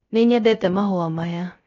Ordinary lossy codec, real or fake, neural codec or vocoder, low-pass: AAC, 32 kbps; fake; codec, 16 kHz, 0.3 kbps, FocalCodec; 7.2 kHz